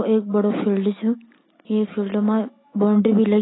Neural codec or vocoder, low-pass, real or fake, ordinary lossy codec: none; 7.2 kHz; real; AAC, 16 kbps